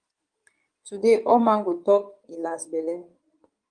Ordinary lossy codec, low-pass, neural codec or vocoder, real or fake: Opus, 32 kbps; 9.9 kHz; codec, 16 kHz in and 24 kHz out, 2.2 kbps, FireRedTTS-2 codec; fake